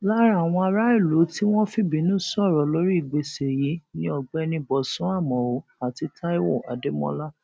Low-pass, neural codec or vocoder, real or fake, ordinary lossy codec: none; none; real; none